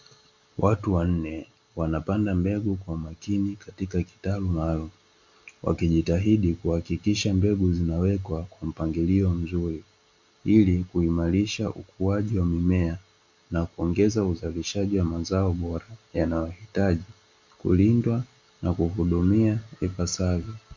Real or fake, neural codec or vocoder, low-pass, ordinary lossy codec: real; none; 7.2 kHz; Opus, 64 kbps